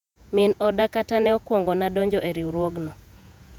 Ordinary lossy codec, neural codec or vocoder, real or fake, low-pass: none; vocoder, 48 kHz, 128 mel bands, Vocos; fake; 19.8 kHz